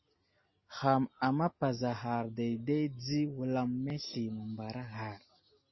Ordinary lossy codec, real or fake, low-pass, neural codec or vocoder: MP3, 24 kbps; real; 7.2 kHz; none